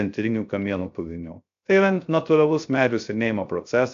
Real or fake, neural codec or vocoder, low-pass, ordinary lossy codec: fake; codec, 16 kHz, 0.3 kbps, FocalCodec; 7.2 kHz; AAC, 64 kbps